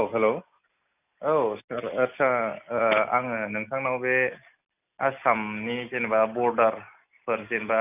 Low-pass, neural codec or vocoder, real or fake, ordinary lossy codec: 3.6 kHz; none; real; none